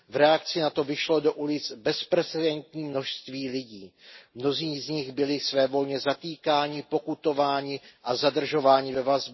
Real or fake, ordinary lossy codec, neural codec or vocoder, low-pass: real; MP3, 24 kbps; none; 7.2 kHz